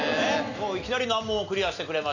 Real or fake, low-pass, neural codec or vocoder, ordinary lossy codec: real; 7.2 kHz; none; none